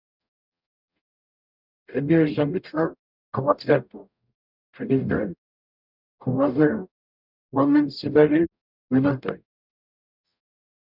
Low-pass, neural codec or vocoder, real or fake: 5.4 kHz; codec, 44.1 kHz, 0.9 kbps, DAC; fake